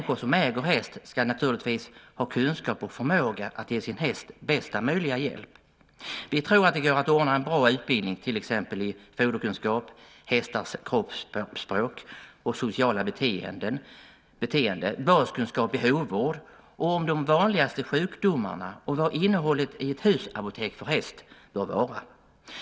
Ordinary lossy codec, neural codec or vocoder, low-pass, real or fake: none; none; none; real